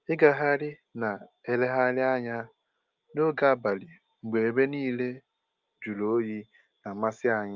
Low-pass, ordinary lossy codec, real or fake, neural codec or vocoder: 7.2 kHz; Opus, 32 kbps; real; none